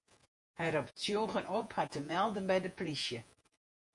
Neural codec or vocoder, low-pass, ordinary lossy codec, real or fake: vocoder, 48 kHz, 128 mel bands, Vocos; 10.8 kHz; MP3, 64 kbps; fake